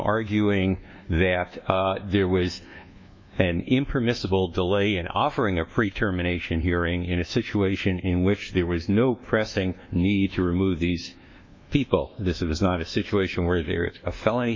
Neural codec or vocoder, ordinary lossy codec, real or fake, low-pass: codec, 24 kHz, 1.2 kbps, DualCodec; MP3, 64 kbps; fake; 7.2 kHz